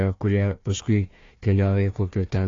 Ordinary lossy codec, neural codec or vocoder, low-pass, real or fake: AAC, 32 kbps; codec, 16 kHz, 1 kbps, FunCodec, trained on Chinese and English, 50 frames a second; 7.2 kHz; fake